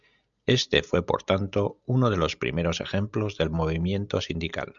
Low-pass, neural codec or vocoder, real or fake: 7.2 kHz; none; real